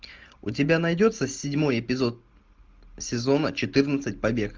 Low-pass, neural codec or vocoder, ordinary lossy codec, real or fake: 7.2 kHz; none; Opus, 32 kbps; real